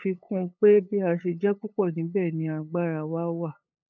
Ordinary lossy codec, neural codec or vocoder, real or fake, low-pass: none; codec, 16 kHz, 8 kbps, FunCodec, trained on LibriTTS, 25 frames a second; fake; 7.2 kHz